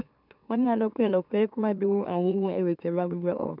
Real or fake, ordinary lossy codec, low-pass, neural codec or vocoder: fake; none; 5.4 kHz; autoencoder, 44.1 kHz, a latent of 192 numbers a frame, MeloTTS